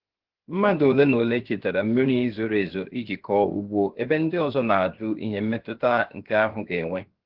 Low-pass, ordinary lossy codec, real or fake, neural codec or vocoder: 5.4 kHz; Opus, 16 kbps; fake; codec, 16 kHz, 0.7 kbps, FocalCodec